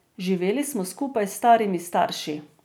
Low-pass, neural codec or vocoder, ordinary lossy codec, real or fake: none; none; none; real